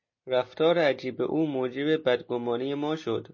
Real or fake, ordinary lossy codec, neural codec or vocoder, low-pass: real; MP3, 32 kbps; none; 7.2 kHz